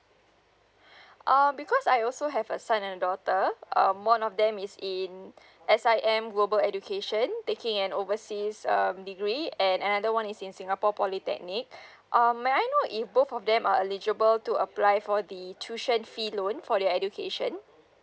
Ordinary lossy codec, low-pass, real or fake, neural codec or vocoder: none; none; real; none